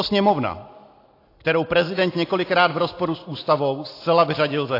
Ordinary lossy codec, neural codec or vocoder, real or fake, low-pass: AAC, 32 kbps; none; real; 5.4 kHz